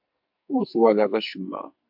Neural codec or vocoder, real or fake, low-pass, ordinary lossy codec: codec, 44.1 kHz, 2.6 kbps, SNAC; fake; 5.4 kHz; AAC, 48 kbps